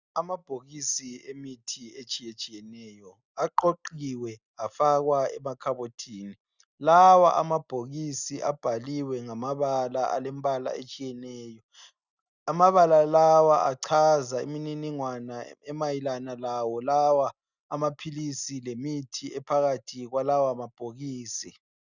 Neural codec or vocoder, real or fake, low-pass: none; real; 7.2 kHz